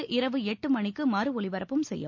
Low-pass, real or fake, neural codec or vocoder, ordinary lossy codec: 7.2 kHz; real; none; MP3, 48 kbps